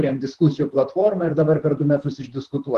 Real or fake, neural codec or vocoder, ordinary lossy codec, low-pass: fake; vocoder, 44.1 kHz, 128 mel bands, Pupu-Vocoder; Opus, 16 kbps; 14.4 kHz